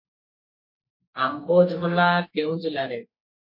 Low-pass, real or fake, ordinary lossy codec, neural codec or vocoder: 5.4 kHz; fake; MP3, 32 kbps; codec, 44.1 kHz, 2.6 kbps, SNAC